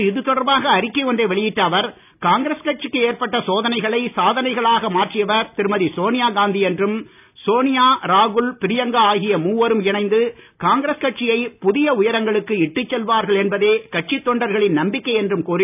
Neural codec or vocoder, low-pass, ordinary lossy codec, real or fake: none; 3.6 kHz; none; real